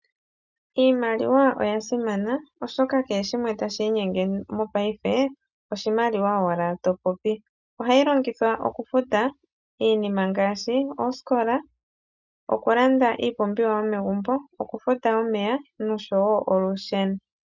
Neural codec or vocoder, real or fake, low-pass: none; real; 7.2 kHz